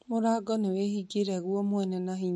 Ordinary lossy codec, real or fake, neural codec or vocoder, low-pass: MP3, 48 kbps; real; none; 14.4 kHz